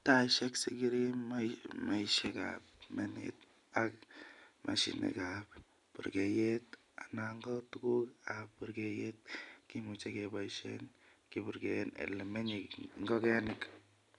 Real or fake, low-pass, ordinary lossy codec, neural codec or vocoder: real; 10.8 kHz; none; none